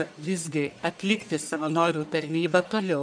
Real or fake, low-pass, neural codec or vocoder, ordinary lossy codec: fake; 9.9 kHz; codec, 44.1 kHz, 1.7 kbps, Pupu-Codec; MP3, 96 kbps